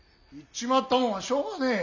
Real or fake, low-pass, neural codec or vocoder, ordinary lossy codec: real; 7.2 kHz; none; none